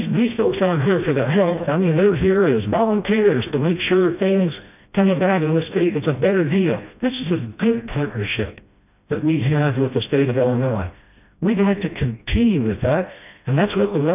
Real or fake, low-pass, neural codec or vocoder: fake; 3.6 kHz; codec, 16 kHz, 1 kbps, FreqCodec, smaller model